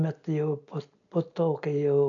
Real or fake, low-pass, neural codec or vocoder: real; 7.2 kHz; none